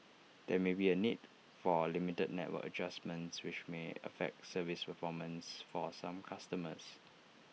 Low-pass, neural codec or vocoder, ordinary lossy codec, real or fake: none; none; none; real